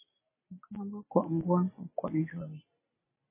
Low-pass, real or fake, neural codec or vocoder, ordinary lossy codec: 3.6 kHz; real; none; MP3, 16 kbps